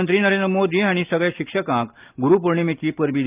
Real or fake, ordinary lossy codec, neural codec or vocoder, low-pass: real; Opus, 24 kbps; none; 3.6 kHz